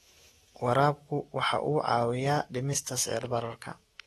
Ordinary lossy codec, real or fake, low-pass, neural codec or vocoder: AAC, 32 kbps; fake; 19.8 kHz; autoencoder, 48 kHz, 128 numbers a frame, DAC-VAE, trained on Japanese speech